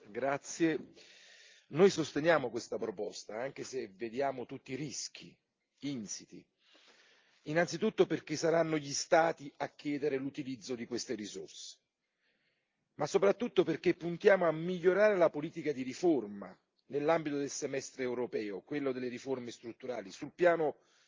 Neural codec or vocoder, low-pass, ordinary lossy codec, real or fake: none; 7.2 kHz; Opus, 24 kbps; real